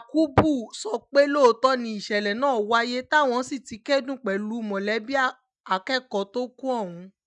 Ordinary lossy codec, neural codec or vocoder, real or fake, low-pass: none; none; real; 10.8 kHz